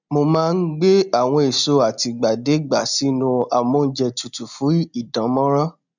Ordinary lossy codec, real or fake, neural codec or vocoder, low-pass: none; real; none; 7.2 kHz